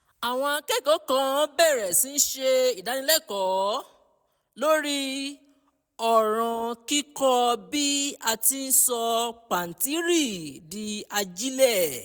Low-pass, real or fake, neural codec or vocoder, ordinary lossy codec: none; real; none; none